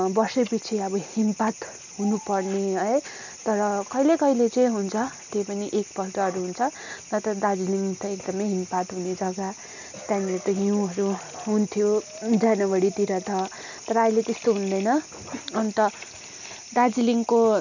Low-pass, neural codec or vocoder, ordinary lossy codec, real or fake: 7.2 kHz; none; none; real